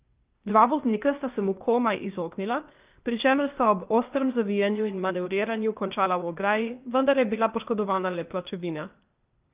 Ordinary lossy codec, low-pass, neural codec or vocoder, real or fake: Opus, 24 kbps; 3.6 kHz; codec, 16 kHz, 0.8 kbps, ZipCodec; fake